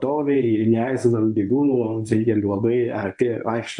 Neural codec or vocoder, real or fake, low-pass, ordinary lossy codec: codec, 24 kHz, 0.9 kbps, WavTokenizer, medium speech release version 1; fake; 10.8 kHz; MP3, 96 kbps